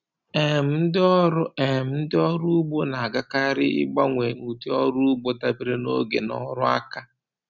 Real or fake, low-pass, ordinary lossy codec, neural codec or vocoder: real; 7.2 kHz; none; none